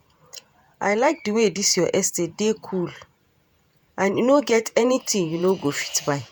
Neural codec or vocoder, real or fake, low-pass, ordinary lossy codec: vocoder, 48 kHz, 128 mel bands, Vocos; fake; none; none